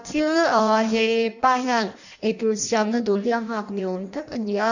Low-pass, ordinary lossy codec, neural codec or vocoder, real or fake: 7.2 kHz; none; codec, 16 kHz in and 24 kHz out, 0.6 kbps, FireRedTTS-2 codec; fake